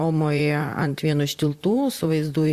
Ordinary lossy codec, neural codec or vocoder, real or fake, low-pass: Opus, 64 kbps; vocoder, 44.1 kHz, 128 mel bands, Pupu-Vocoder; fake; 14.4 kHz